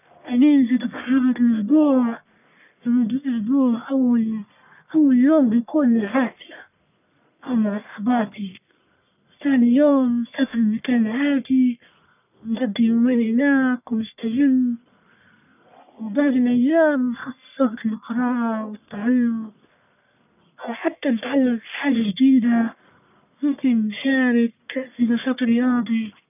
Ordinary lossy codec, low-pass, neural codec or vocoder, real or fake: none; 3.6 kHz; codec, 44.1 kHz, 1.7 kbps, Pupu-Codec; fake